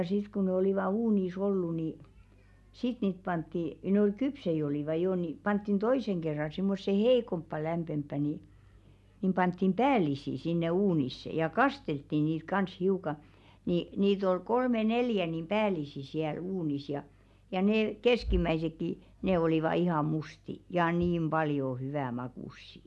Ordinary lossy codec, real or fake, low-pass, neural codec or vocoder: none; real; none; none